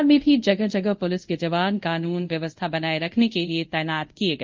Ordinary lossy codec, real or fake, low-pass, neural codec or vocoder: Opus, 24 kbps; fake; 7.2 kHz; codec, 24 kHz, 0.5 kbps, DualCodec